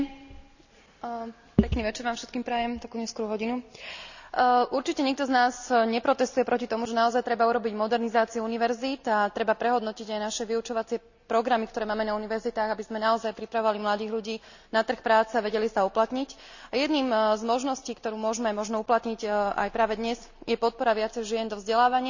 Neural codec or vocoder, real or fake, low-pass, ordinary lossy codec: none; real; 7.2 kHz; none